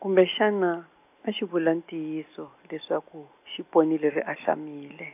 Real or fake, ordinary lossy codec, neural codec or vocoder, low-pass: real; AAC, 32 kbps; none; 3.6 kHz